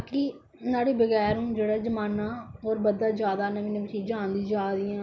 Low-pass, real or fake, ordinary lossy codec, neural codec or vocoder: none; real; none; none